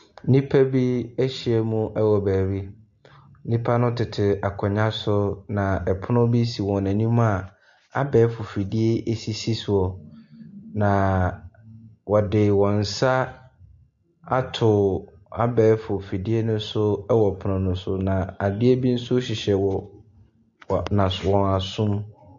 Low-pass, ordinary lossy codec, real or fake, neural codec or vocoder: 7.2 kHz; MP3, 48 kbps; real; none